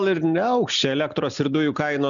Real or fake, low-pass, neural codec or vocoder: real; 7.2 kHz; none